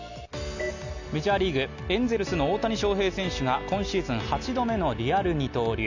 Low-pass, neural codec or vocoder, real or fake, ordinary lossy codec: 7.2 kHz; none; real; none